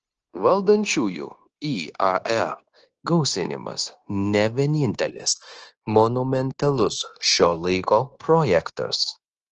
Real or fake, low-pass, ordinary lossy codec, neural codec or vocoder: fake; 7.2 kHz; Opus, 16 kbps; codec, 16 kHz, 0.9 kbps, LongCat-Audio-Codec